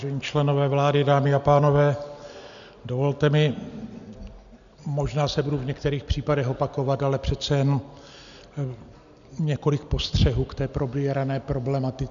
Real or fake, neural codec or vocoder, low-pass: real; none; 7.2 kHz